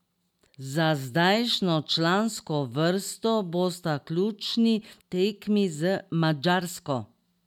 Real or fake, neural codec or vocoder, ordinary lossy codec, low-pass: real; none; none; 19.8 kHz